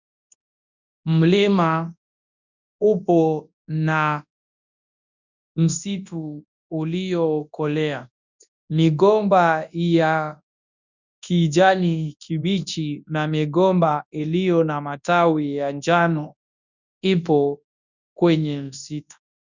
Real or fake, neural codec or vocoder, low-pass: fake; codec, 24 kHz, 0.9 kbps, WavTokenizer, large speech release; 7.2 kHz